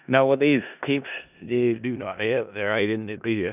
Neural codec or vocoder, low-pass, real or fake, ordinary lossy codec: codec, 16 kHz in and 24 kHz out, 0.4 kbps, LongCat-Audio-Codec, four codebook decoder; 3.6 kHz; fake; none